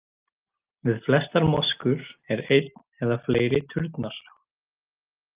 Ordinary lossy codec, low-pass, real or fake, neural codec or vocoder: Opus, 32 kbps; 3.6 kHz; real; none